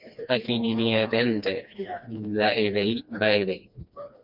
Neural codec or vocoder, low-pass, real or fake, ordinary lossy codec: codec, 16 kHz, 2 kbps, FreqCodec, smaller model; 5.4 kHz; fake; MP3, 48 kbps